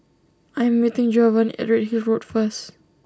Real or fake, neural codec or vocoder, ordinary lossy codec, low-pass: real; none; none; none